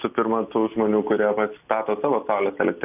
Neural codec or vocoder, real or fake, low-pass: none; real; 3.6 kHz